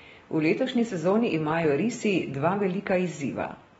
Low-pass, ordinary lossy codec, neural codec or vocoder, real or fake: 9.9 kHz; AAC, 24 kbps; none; real